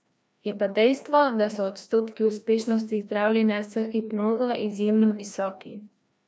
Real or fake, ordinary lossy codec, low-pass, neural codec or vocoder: fake; none; none; codec, 16 kHz, 1 kbps, FreqCodec, larger model